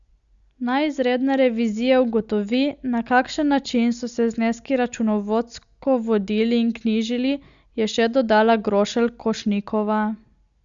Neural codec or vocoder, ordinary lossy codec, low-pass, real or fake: none; Opus, 64 kbps; 7.2 kHz; real